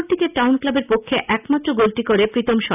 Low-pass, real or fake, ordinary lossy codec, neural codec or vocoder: 3.6 kHz; real; none; none